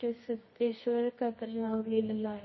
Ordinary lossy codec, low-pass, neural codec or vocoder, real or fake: MP3, 24 kbps; 7.2 kHz; codec, 24 kHz, 0.9 kbps, WavTokenizer, medium music audio release; fake